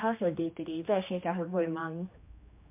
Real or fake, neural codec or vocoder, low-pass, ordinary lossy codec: fake; codec, 16 kHz, 2 kbps, X-Codec, HuBERT features, trained on general audio; 3.6 kHz; MP3, 32 kbps